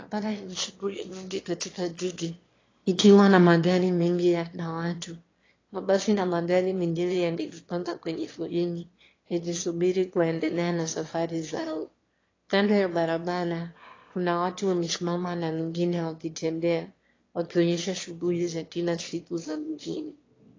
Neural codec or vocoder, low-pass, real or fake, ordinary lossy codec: autoencoder, 22.05 kHz, a latent of 192 numbers a frame, VITS, trained on one speaker; 7.2 kHz; fake; AAC, 32 kbps